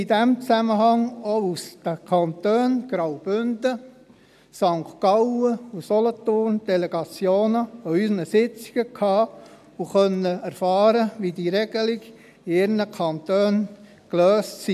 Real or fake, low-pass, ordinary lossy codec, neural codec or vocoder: real; 14.4 kHz; none; none